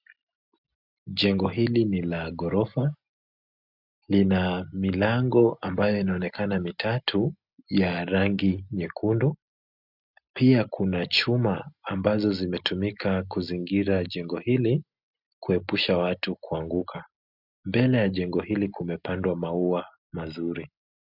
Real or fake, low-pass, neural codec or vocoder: real; 5.4 kHz; none